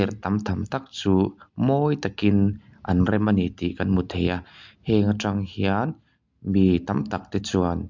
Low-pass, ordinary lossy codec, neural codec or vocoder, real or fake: 7.2 kHz; MP3, 64 kbps; none; real